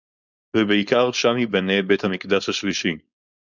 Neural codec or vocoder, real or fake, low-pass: codec, 16 kHz, 4.8 kbps, FACodec; fake; 7.2 kHz